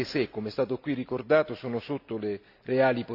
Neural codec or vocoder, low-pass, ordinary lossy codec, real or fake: none; 5.4 kHz; none; real